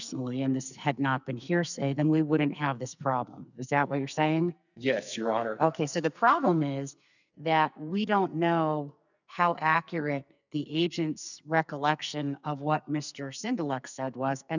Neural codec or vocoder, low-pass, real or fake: codec, 44.1 kHz, 2.6 kbps, SNAC; 7.2 kHz; fake